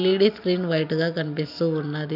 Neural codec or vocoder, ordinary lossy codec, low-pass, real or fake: none; none; 5.4 kHz; real